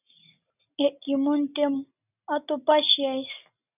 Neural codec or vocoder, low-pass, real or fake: none; 3.6 kHz; real